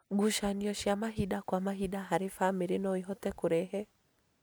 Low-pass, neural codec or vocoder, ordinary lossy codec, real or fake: none; none; none; real